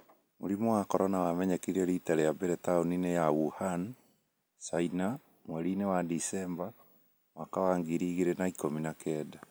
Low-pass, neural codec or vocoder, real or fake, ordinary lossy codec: none; none; real; none